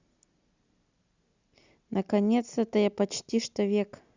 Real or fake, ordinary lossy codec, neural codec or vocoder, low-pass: real; Opus, 64 kbps; none; 7.2 kHz